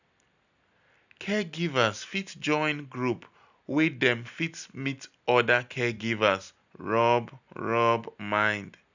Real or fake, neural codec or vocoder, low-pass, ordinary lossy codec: real; none; 7.2 kHz; none